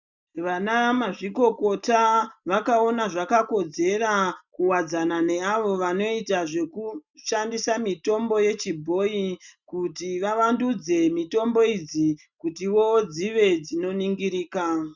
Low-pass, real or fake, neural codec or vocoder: 7.2 kHz; real; none